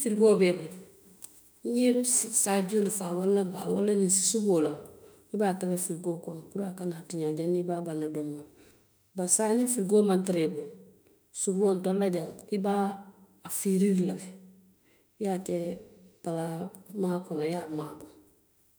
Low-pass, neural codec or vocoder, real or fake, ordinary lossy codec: none; autoencoder, 48 kHz, 32 numbers a frame, DAC-VAE, trained on Japanese speech; fake; none